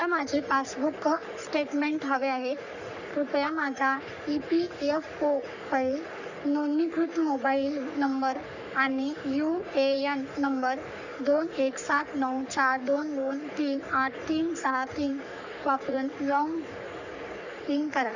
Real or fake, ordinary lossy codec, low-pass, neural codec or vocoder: fake; none; 7.2 kHz; codec, 44.1 kHz, 3.4 kbps, Pupu-Codec